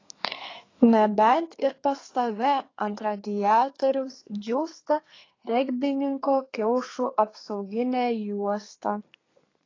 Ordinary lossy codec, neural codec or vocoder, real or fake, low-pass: AAC, 32 kbps; codec, 32 kHz, 1.9 kbps, SNAC; fake; 7.2 kHz